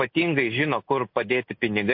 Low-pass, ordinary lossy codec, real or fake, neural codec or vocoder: 5.4 kHz; MP3, 32 kbps; real; none